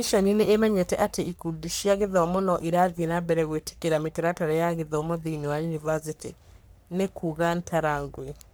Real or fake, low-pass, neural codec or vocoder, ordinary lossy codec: fake; none; codec, 44.1 kHz, 3.4 kbps, Pupu-Codec; none